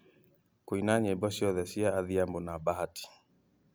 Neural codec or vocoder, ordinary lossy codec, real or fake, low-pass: none; none; real; none